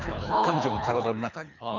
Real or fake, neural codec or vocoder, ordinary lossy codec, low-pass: fake; codec, 24 kHz, 3 kbps, HILCodec; none; 7.2 kHz